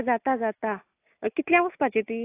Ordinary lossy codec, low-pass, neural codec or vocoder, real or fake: AAC, 32 kbps; 3.6 kHz; none; real